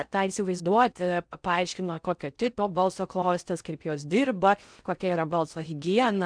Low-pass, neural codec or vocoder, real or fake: 9.9 kHz; codec, 16 kHz in and 24 kHz out, 0.6 kbps, FocalCodec, streaming, 4096 codes; fake